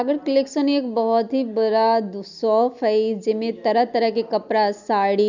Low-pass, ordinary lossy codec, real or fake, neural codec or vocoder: 7.2 kHz; none; real; none